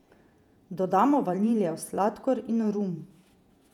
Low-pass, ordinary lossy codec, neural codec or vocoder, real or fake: 19.8 kHz; none; vocoder, 48 kHz, 128 mel bands, Vocos; fake